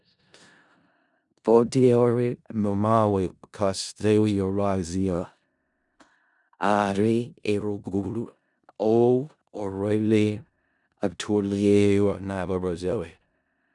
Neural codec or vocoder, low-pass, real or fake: codec, 16 kHz in and 24 kHz out, 0.4 kbps, LongCat-Audio-Codec, four codebook decoder; 10.8 kHz; fake